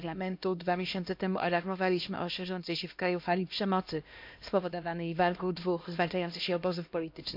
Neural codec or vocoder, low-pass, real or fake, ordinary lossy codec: codec, 16 kHz, 1 kbps, X-Codec, HuBERT features, trained on LibriSpeech; 5.4 kHz; fake; MP3, 48 kbps